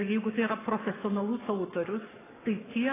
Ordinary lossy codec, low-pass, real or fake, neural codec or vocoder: AAC, 16 kbps; 3.6 kHz; fake; codec, 44.1 kHz, 7.8 kbps, Pupu-Codec